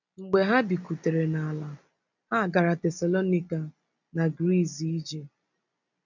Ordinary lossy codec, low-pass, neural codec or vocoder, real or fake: none; 7.2 kHz; none; real